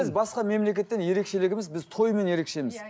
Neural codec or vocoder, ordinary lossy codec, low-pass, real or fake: none; none; none; real